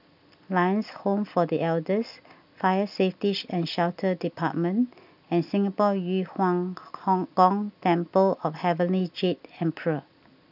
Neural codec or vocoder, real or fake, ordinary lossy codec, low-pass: none; real; none; 5.4 kHz